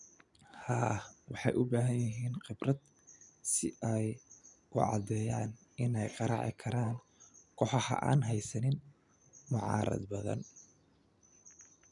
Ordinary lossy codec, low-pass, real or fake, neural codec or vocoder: none; 10.8 kHz; real; none